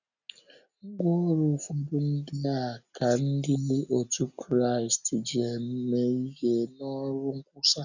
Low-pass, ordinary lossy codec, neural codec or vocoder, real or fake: 7.2 kHz; none; none; real